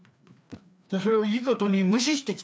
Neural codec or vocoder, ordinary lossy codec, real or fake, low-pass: codec, 16 kHz, 2 kbps, FreqCodec, larger model; none; fake; none